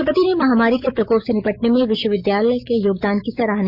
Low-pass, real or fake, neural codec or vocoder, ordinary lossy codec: 5.4 kHz; fake; autoencoder, 48 kHz, 128 numbers a frame, DAC-VAE, trained on Japanese speech; MP3, 48 kbps